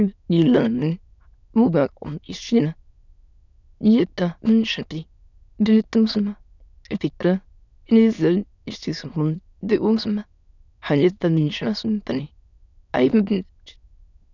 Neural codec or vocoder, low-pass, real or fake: autoencoder, 22.05 kHz, a latent of 192 numbers a frame, VITS, trained on many speakers; 7.2 kHz; fake